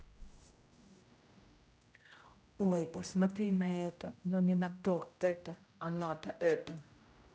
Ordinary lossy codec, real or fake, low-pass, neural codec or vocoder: none; fake; none; codec, 16 kHz, 0.5 kbps, X-Codec, HuBERT features, trained on general audio